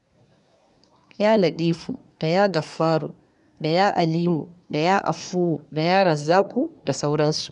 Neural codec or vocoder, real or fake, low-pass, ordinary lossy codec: codec, 24 kHz, 1 kbps, SNAC; fake; 10.8 kHz; none